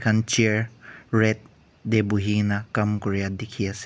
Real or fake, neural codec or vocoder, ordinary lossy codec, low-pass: real; none; none; none